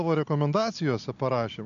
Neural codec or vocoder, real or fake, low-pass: none; real; 7.2 kHz